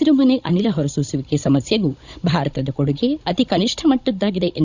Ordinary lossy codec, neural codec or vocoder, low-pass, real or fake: none; codec, 16 kHz, 16 kbps, FunCodec, trained on Chinese and English, 50 frames a second; 7.2 kHz; fake